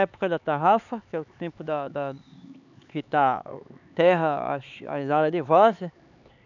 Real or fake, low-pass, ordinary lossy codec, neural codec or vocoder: fake; 7.2 kHz; none; codec, 16 kHz, 4 kbps, X-Codec, HuBERT features, trained on LibriSpeech